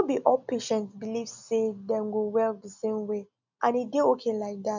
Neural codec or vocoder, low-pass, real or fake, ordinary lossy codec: none; 7.2 kHz; real; none